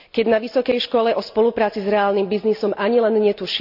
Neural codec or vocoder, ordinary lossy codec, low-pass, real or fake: none; none; 5.4 kHz; real